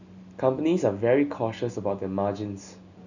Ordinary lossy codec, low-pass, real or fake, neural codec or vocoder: none; 7.2 kHz; real; none